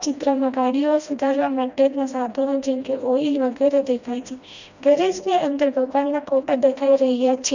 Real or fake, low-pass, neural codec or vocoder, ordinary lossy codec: fake; 7.2 kHz; codec, 16 kHz, 1 kbps, FreqCodec, smaller model; none